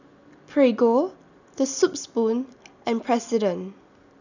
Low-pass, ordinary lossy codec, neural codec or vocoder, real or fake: 7.2 kHz; none; none; real